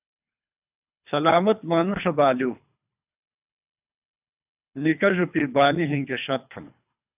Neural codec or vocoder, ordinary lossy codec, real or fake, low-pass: codec, 24 kHz, 3 kbps, HILCodec; AAC, 32 kbps; fake; 3.6 kHz